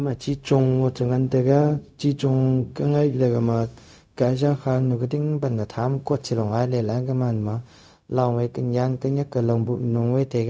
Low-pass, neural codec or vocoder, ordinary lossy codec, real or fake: none; codec, 16 kHz, 0.4 kbps, LongCat-Audio-Codec; none; fake